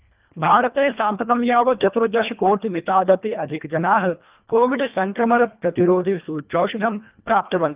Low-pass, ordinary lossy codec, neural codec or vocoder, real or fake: 3.6 kHz; Opus, 24 kbps; codec, 24 kHz, 1.5 kbps, HILCodec; fake